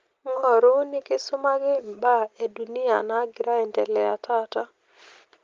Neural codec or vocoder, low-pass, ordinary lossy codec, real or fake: none; 7.2 kHz; Opus, 32 kbps; real